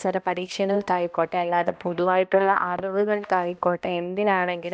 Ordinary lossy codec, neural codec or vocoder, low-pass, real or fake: none; codec, 16 kHz, 1 kbps, X-Codec, HuBERT features, trained on balanced general audio; none; fake